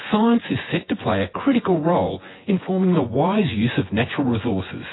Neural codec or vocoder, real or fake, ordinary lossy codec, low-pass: vocoder, 24 kHz, 100 mel bands, Vocos; fake; AAC, 16 kbps; 7.2 kHz